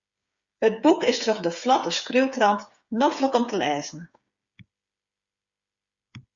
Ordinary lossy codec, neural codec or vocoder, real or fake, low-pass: Opus, 64 kbps; codec, 16 kHz, 8 kbps, FreqCodec, smaller model; fake; 7.2 kHz